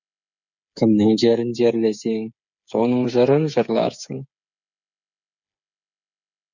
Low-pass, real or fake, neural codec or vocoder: 7.2 kHz; fake; codec, 16 kHz, 8 kbps, FreqCodec, smaller model